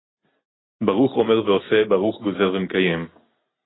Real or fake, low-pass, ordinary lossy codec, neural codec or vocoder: real; 7.2 kHz; AAC, 16 kbps; none